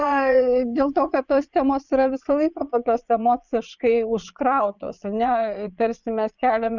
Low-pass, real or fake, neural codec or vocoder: 7.2 kHz; fake; vocoder, 22.05 kHz, 80 mel bands, Vocos